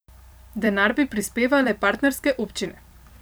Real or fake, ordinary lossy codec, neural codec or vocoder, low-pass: fake; none; vocoder, 44.1 kHz, 128 mel bands every 256 samples, BigVGAN v2; none